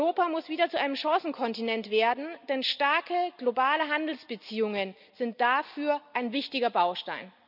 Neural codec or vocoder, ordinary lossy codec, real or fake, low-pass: none; none; real; 5.4 kHz